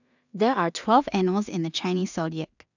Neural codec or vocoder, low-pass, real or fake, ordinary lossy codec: codec, 16 kHz in and 24 kHz out, 0.4 kbps, LongCat-Audio-Codec, two codebook decoder; 7.2 kHz; fake; none